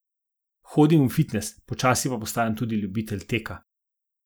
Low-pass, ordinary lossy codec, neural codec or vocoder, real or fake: none; none; none; real